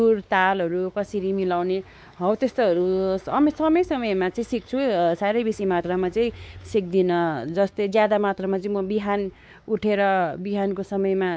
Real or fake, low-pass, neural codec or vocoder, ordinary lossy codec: fake; none; codec, 16 kHz, 4 kbps, X-Codec, WavLM features, trained on Multilingual LibriSpeech; none